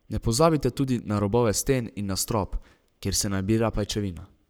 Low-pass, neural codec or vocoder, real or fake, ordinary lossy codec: none; codec, 44.1 kHz, 7.8 kbps, Pupu-Codec; fake; none